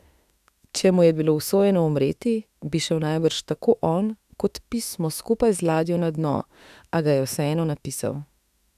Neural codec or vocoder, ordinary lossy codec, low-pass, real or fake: autoencoder, 48 kHz, 32 numbers a frame, DAC-VAE, trained on Japanese speech; none; 14.4 kHz; fake